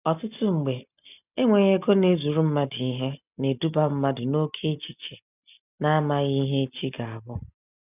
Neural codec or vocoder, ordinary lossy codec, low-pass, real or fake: none; none; 3.6 kHz; real